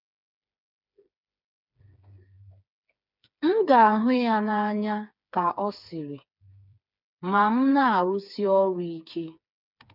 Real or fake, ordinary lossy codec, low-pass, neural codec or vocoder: fake; none; 5.4 kHz; codec, 16 kHz, 4 kbps, FreqCodec, smaller model